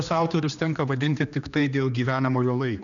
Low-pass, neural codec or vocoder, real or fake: 7.2 kHz; codec, 16 kHz, 2 kbps, X-Codec, HuBERT features, trained on general audio; fake